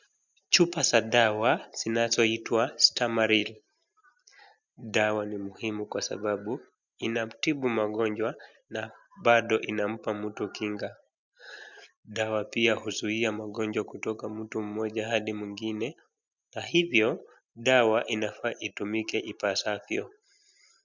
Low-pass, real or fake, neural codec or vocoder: 7.2 kHz; real; none